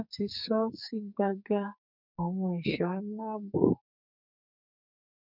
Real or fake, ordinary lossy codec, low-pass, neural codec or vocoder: fake; none; 5.4 kHz; codec, 44.1 kHz, 2.6 kbps, SNAC